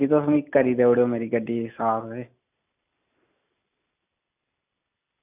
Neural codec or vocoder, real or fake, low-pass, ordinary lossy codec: none; real; 3.6 kHz; none